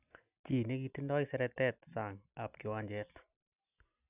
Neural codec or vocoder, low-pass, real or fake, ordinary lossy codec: none; 3.6 kHz; real; none